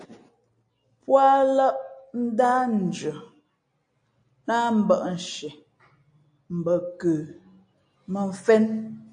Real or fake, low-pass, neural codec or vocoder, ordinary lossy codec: real; 9.9 kHz; none; AAC, 64 kbps